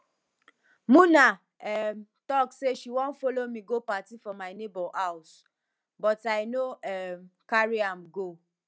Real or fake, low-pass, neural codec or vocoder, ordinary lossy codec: real; none; none; none